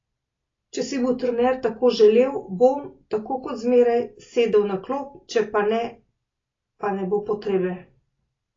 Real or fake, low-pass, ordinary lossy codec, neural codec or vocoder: real; 7.2 kHz; AAC, 32 kbps; none